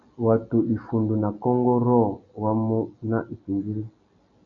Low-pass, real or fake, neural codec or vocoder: 7.2 kHz; real; none